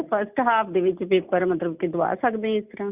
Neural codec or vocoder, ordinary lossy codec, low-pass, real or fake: none; Opus, 64 kbps; 3.6 kHz; real